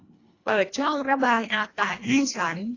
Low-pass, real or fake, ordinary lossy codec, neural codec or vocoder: 7.2 kHz; fake; AAC, 32 kbps; codec, 24 kHz, 1.5 kbps, HILCodec